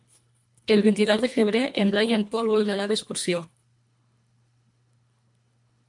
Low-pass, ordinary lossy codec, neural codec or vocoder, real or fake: 10.8 kHz; MP3, 64 kbps; codec, 24 kHz, 1.5 kbps, HILCodec; fake